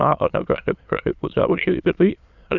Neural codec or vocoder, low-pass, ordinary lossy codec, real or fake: autoencoder, 22.05 kHz, a latent of 192 numbers a frame, VITS, trained on many speakers; 7.2 kHz; Opus, 64 kbps; fake